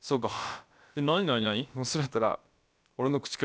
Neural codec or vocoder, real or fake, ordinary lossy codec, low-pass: codec, 16 kHz, about 1 kbps, DyCAST, with the encoder's durations; fake; none; none